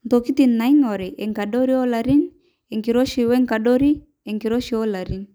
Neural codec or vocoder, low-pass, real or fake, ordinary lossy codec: none; none; real; none